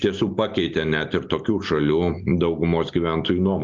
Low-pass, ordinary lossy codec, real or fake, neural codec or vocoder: 7.2 kHz; Opus, 24 kbps; real; none